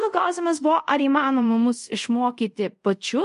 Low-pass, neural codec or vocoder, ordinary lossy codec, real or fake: 10.8 kHz; codec, 24 kHz, 0.5 kbps, DualCodec; MP3, 48 kbps; fake